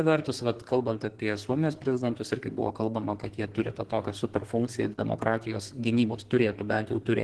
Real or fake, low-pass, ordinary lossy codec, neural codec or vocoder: fake; 10.8 kHz; Opus, 16 kbps; codec, 32 kHz, 1.9 kbps, SNAC